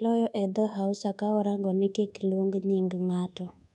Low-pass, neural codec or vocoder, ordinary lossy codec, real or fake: 10.8 kHz; codec, 24 kHz, 1.2 kbps, DualCodec; none; fake